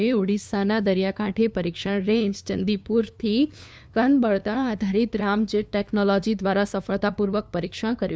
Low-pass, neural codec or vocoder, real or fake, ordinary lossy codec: none; codec, 16 kHz, 2 kbps, FunCodec, trained on LibriTTS, 25 frames a second; fake; none